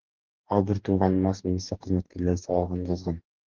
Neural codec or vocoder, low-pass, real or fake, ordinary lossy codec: codec, 44.1 kHz, 2.6 kbps, DAC; 7.2 kHz; fake; Opus, 16 kbps